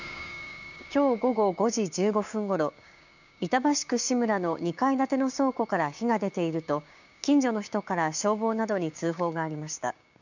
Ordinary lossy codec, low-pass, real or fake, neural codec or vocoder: none; 7.2 kHz; fake; codec, 16 kHz, 6 kbps, DAC